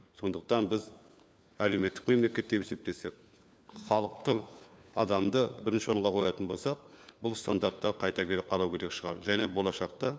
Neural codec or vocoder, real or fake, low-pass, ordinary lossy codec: codec, 16 kHz, 4 kbps, FunCodec, trained on LibriTTS, 50 frames a second; fake; none; none